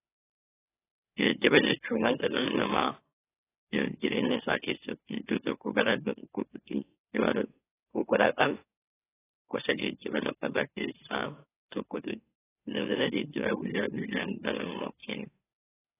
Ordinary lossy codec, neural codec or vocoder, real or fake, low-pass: AAC, 16 kbps; autoencoder, 44.1 kHz, a latent of 192 numbers a frame, MeloTTS; fake; 3.6 kHz